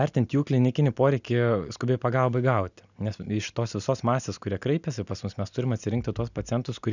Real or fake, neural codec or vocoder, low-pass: real; none; 7.2 kHz